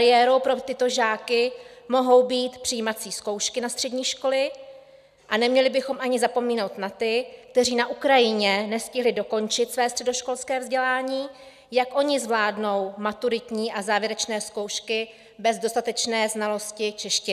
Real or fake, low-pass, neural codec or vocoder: real; 14.4 kHz; none